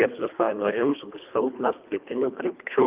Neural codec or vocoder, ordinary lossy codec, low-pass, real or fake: codec, 24 kHz, 1.5 kbps, HILCodec; Opus, 24 kbps; 3.6 kHz; fake